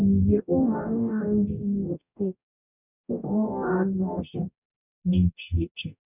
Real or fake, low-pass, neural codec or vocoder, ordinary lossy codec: fake; 3.6 kHz; codec, 44.1 kHz, 0.9 kbps, DAC; none